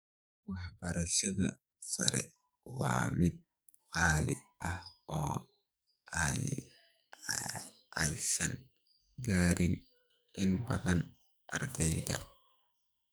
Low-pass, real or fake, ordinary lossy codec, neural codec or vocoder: none; fake; none; codec, 44.1 kHz, 2.6 kbps, SNAC